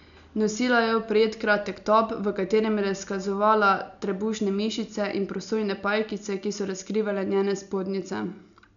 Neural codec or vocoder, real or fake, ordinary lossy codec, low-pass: none; real; none; 7.2 kHz